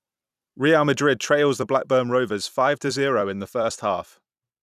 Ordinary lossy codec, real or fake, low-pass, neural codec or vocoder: none; fake; 14.4 kHz; vocoder, 44.1 kHz, 128 mel bands every 256 samples, BigVGAN v2